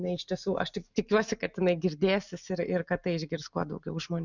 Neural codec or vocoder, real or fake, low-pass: none; real; 7.2 kHz